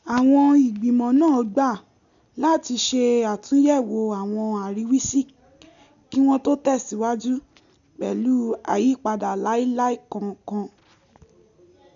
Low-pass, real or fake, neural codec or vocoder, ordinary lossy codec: 7.2 kHz; real; none; none